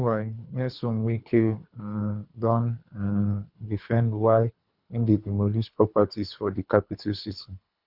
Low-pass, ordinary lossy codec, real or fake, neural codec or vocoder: 5.4 kHz; Opus, 64 kbps; fake; codec, 24 kHz, 3 kbps, HILCodec